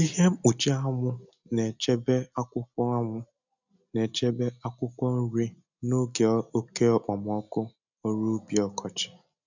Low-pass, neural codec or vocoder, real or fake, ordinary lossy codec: 7.2 kHz; none; real; none